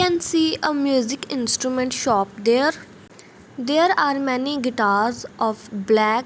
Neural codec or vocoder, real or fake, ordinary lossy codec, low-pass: none; real; none; none